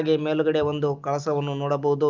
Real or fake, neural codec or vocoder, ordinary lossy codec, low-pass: real; none; Opus, 24 kbps; 7.2 kHz